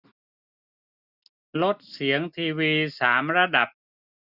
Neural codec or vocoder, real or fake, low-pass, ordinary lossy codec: none; real; 5.4 kHz; none